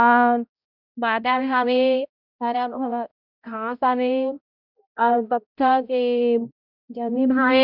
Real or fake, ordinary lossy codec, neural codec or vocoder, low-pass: fake; none; codec, 16 kHz, 0.5 kbps, X-Codec, HuBERT features, trained on balanced general audio; 5.4 kHz